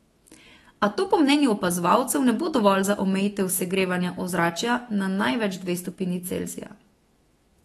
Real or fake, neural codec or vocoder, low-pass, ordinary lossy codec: fake; autoencoder, 48 kHz, 128 numbers a frame, DAC-VAE, trained on Japanese speech; 19.8 kHz; AAC, 32 kbps